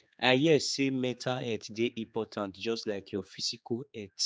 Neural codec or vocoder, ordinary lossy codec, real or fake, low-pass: codec, 16 kHz, 4 kbps, X-Codec, HuBERT features, trained on general audio; none; fake; none